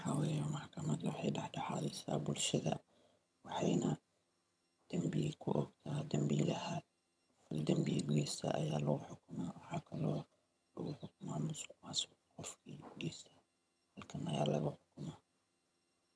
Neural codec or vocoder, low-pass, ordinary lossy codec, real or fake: vocoder, 22.05 kHz, 80 mel bands, HiFi-GAN; none; none; fake